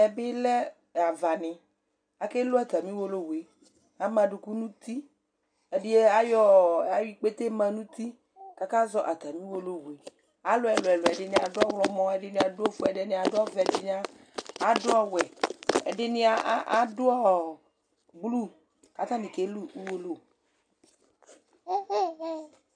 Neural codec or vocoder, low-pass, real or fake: none; 9.9 kHz; real